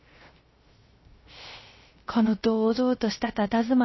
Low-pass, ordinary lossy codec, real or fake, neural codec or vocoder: 7.2 kHz; MP3, 24 kbps; fake; codec, 16 kHz, 0.3 kbps, FocalCodec